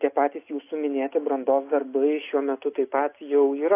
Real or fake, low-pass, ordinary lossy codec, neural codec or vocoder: real; 3.6 kHz; AAC, 24 kbps; none